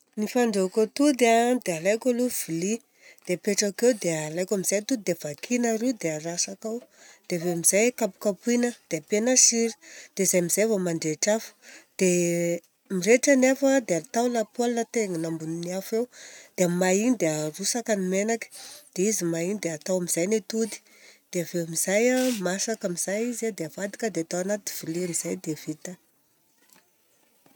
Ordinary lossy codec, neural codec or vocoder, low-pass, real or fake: none; none; none; real